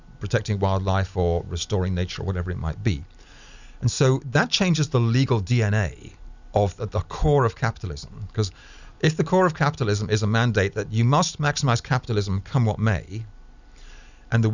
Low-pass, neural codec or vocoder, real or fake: 7.2 kHz; none; real